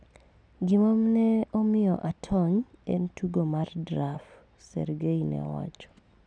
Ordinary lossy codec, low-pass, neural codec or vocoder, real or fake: none; 9.9 kHz; none; real